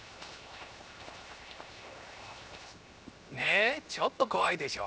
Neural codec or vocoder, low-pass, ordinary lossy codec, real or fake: codec, 16 kHz, 0.7 kbps, FocalCodec; none; none; fake